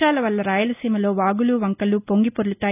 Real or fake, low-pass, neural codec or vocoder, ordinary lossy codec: real; 3.6 kHz; none; none